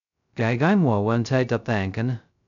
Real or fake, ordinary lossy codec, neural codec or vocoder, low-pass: fake; none; codec, 16 kHz, 0.2 kbps, FocalCodec; 7.2 kHz